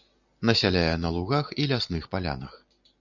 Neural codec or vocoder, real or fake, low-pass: none; real; 7.2 kHz